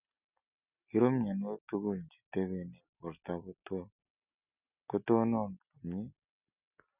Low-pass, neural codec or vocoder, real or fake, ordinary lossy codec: 3.6 kHz; none; real; none